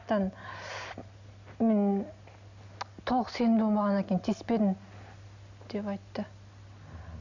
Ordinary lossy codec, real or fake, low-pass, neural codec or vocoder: none; real; 7.2 kHz; none